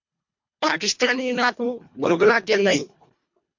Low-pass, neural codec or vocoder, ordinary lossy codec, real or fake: 7.2 kHz; codec, 24 kHz, 1.5 kbps, HILCodec; MP3, 48 kbps; fake